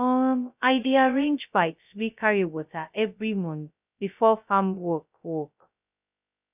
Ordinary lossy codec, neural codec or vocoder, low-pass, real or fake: none; codec, 16 kHz, 0.2 kbps, FocalCodec; 3.6 kHz; fake